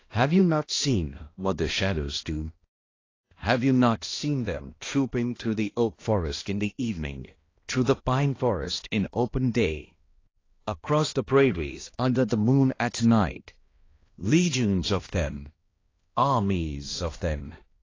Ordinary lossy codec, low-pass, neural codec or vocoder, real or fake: AAC, 32 kbps; 7.2 kHz; codec, 16 kHz, 1 kbps, X-Codec, HuBERT features, trained on balanced general audio; fake